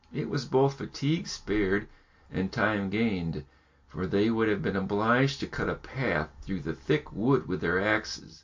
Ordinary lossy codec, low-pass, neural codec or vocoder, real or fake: MP3, 48 kbps; 7.2 kHz; none; real